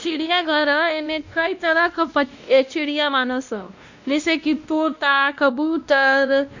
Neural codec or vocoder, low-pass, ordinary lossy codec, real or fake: codec, 16 kHz, 1 kbps, X-Codec, WavLM features, trained on Multilingual LibriSpeech; 7.2 kHz; none; fake